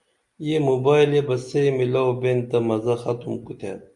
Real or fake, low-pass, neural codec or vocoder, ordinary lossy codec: real; 10.8 kHz; none; Opus, 64 kbps